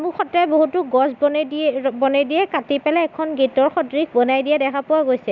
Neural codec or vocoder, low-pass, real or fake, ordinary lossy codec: none; 7.2 kHz; real; none